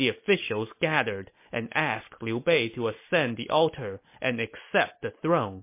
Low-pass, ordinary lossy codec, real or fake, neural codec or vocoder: 3.6 kHz; MP3, 24 kbps; real; none